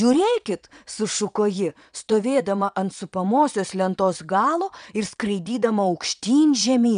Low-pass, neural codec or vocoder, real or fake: 9.9 kHz; vocoder, 22.05 kHz, 80 mel bands, Vocos; fake